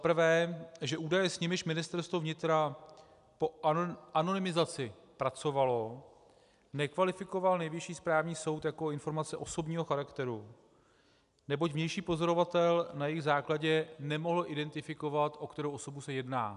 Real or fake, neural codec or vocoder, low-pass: real; none; 10.8 kHz